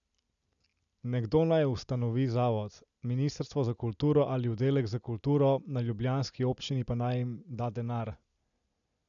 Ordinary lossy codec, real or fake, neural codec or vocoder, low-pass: none; real; none; 7.2 kHz